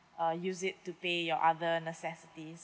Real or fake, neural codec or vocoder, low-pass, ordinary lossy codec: real; none; none; none